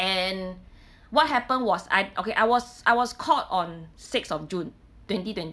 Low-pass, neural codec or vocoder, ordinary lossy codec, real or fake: none; none; none; real